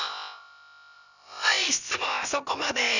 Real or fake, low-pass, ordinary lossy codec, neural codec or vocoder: fake; 7.2 kHz; none; codec, 16 kHz, about 1 kbps, DyCAST, with the encoder's durations